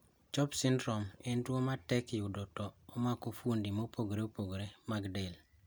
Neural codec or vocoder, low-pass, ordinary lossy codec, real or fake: none; none; none; real